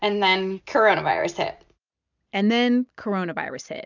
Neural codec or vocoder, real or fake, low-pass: none; real; 7.2 kHz